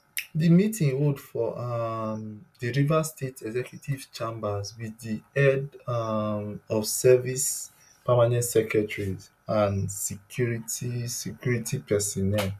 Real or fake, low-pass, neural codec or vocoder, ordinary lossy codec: real; 14.4 kHz; none; none